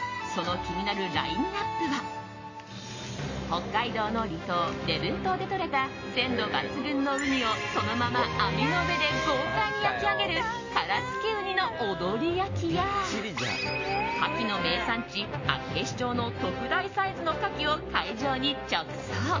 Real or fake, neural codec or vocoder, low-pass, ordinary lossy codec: real; none; 7.2 kHz; MP3, 32 kbps